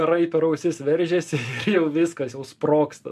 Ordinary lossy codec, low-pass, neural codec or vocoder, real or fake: MP3, 64 kbps; 14.4 kHz; none; real